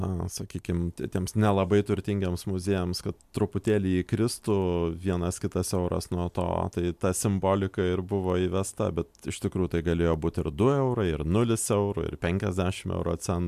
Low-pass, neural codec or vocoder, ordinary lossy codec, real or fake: 14.4 kHz; none; MP3, 96 kbps; real